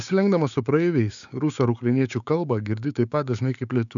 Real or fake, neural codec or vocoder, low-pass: fake; codec, 16 kHz, 6 kbps, DAC; 7.2 kHz